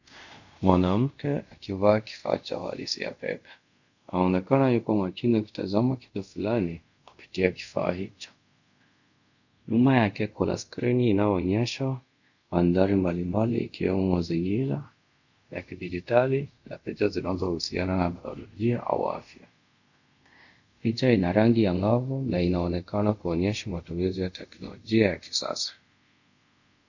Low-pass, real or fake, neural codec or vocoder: 7.2 kHz; fake; codec, 24 kHz, 0.5 kbps, DualCodec